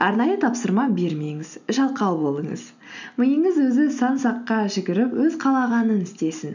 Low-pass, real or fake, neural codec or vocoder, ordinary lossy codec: 7.2 kHz; real; none; none